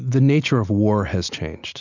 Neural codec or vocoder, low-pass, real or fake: none; 7.2 kHz; real